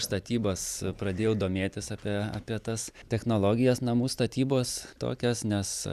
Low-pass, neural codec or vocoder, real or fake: 14.4 kHz; vocoder, 44.1 kHz, 128 mel bands every 256 samples, BigVGAN v2; fake